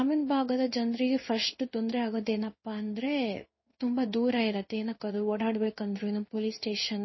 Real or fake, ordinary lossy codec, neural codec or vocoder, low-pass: fake; MP3, 24 kbps; codec, 16 kHz in and 24 kHz out, 1 kbps, XY-Tokenizer; 7.2 kHz